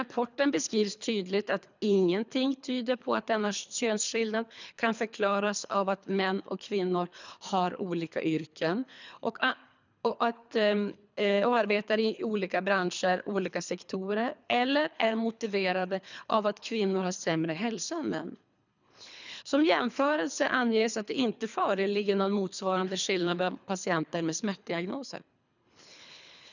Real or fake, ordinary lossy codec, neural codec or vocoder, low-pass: fake; none; codec, 24 kHz, 3 kbps, HILCodec; 7.2 kHz